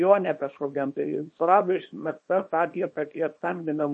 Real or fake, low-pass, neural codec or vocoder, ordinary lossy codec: fake; 10.8 kHz; codec, 24 kHz, 0.9 kbps, WavTokenizer, small release; MP3, 32 kbps